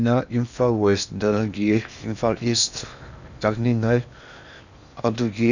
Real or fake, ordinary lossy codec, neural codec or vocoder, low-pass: fake; none; codec, 16 kHz in and 24 kHz out, 0.8 kbps, FocalCodec, streaming, 65536 codes; 7.2 kHz